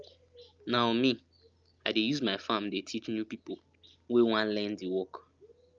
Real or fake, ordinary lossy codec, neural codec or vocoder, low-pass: real; Opus, 24 kbps; none; 7.2 kHz